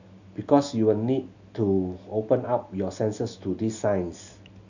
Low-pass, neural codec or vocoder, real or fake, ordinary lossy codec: 7.2 kHz; none; real; none